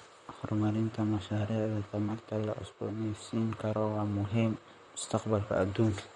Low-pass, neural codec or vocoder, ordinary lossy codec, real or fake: 19.8 kHz; vocoder, 44.1 kHz, 128 mel bands, Pupu-Vocoder; MP3, 48 kbps; fake